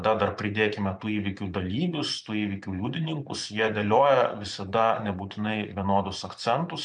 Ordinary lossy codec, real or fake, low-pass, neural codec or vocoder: MP3, 96 kbps; fake; 10.8 kHz; vocoder, 24 kHz, 100 mel bands, Vocos